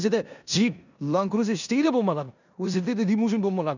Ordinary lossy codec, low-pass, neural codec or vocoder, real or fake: none; 7.2 kHz; codec, 16 kHz in and 24 kHz out, 0.9 kbps, LongCat-Audio-Codec, four codebook decoder; fake